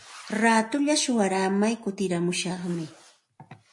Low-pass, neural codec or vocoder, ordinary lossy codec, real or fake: 10.8 kHz; none; MP3, 48 kbps; real